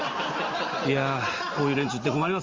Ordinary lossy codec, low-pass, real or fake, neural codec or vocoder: Opus, 32 kbps; 7.2 kHz; real; none